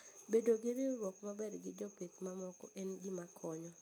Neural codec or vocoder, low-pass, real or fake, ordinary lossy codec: none; none; real; none